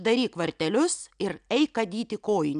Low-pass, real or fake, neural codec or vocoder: 9.9 kHz; real; none